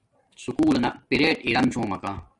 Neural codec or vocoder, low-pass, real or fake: none; 10.8 kHz; real